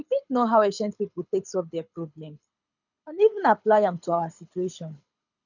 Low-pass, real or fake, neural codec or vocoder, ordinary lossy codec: 7.2 kHz; fake; codec, 24 kHz, 6 kbps, HILCodec; none